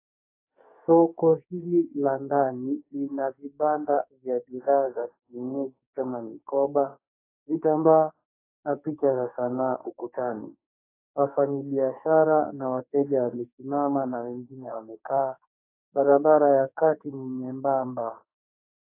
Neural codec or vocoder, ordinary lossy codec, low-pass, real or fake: codec, 44.1 kHz, 2.6 kbps, SNAC; AAC, 24 kbps; 3.6 kHz; fake